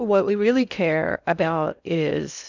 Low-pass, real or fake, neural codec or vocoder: 7.2 kHz; fake; codec, 16 kHz in and 24 kHz out, 0.6 kbps, FocalCodec, streaming, 2048 codes